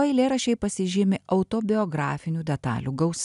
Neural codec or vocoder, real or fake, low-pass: none; real; 10.8 kHz